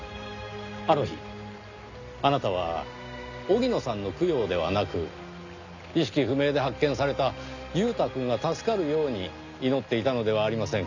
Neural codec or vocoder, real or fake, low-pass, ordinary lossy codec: none; real; 7.2 kHz; none